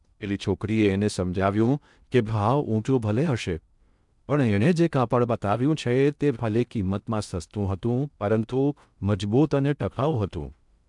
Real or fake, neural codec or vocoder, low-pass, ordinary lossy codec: fake; codec, 16 kHz in and 24 kHz out, 0.6 kbps, FocalCodec, streaming, 2048 codes; 10.8 kHz; none